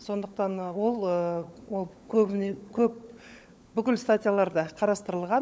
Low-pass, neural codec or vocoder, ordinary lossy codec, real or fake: none; codec, 16 kHz, 16 kbps, FunCodec, trained on LibriTTS, 50 frames a second; none; fake